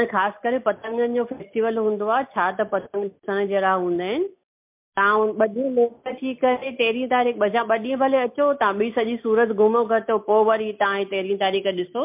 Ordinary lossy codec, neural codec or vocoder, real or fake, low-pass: MP3, 32 kbps; none; real; 3.6 kHz